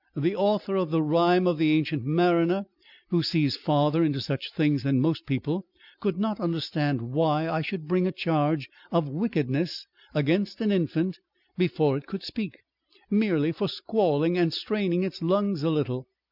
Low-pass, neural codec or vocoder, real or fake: 5.4 kHz; none; real